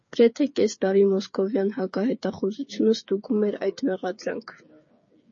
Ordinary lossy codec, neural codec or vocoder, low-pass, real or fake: MP3, 32 kbps; codec, 16 kHz, 8 kbps, FreqCodec, smaller model; 7.2 kHz; fake